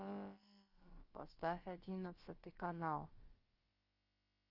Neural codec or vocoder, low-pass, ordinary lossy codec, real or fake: codec, 16 kHz, about 1 kbps, DyCAST, with the encoder's durations; 5.4 kHz; Opus, 24 kbps; fake